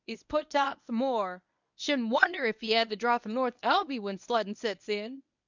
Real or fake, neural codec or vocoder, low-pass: fake; codec, 24 kHz, 0.9 kbps, WavTokenizer, medium speech release version 1; 7.2 kHz